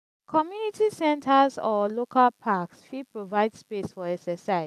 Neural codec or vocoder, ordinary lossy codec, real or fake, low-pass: none; none; real; 14.4 kHz